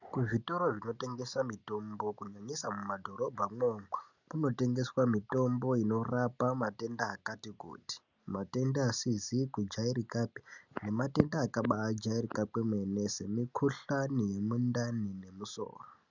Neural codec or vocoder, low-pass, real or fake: none; 7.2 kHz; real